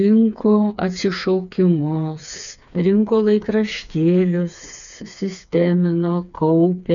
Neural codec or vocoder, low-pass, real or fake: codec, 16 kHz, 4 kbps, FreqCodec, smaller model; 7.2 kHz; fake